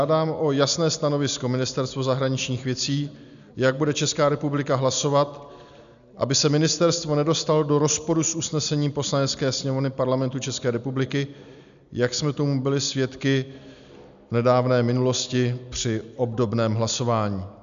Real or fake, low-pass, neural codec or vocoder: real; 7.2 kHz; none